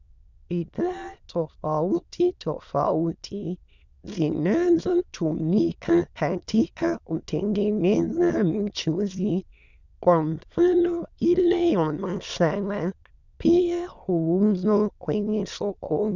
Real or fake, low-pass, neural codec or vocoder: fake; 7.2 kHz; autoencoder, 22.05 kHz, a latent of 192 numbers a frame, VITS, trained on many speakers